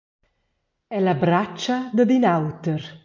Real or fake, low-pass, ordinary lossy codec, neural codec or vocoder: real; 7.2 kHz; MP3, 48 kbps; none